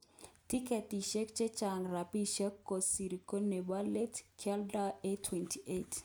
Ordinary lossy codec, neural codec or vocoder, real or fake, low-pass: none; none; real; none